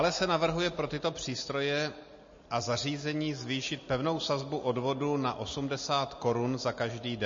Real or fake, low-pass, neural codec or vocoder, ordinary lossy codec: real; 7.2 kHz; none; MP3, 32 kbps